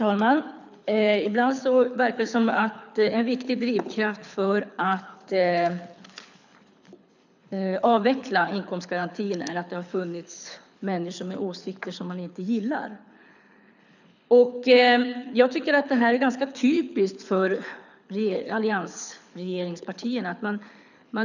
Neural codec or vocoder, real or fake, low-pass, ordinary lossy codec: codec, 24 kHz, 6 kbps, HILCodec; fake; 7.2 kHz; none